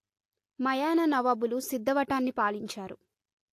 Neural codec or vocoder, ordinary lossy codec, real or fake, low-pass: none; AAC, 64 kbps; real; 14.4 kHz